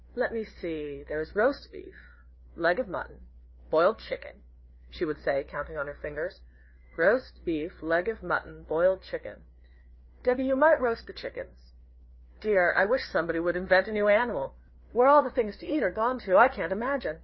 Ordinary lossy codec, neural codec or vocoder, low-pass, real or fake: MP3, 24 kbps; codec, 16 kHz, 4 kbps, FreqCodec, larger model; 7.2 kHz; fake